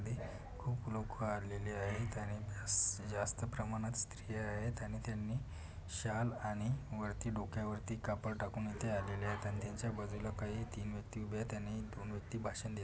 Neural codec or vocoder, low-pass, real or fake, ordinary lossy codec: none; none; real; none